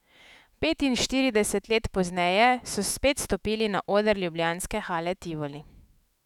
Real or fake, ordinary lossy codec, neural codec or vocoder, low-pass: fake; none; autoencoder, 48 kHz, 128 numbers a frame, DAC-VAE, trained on Japanese speech; 19.8 kHz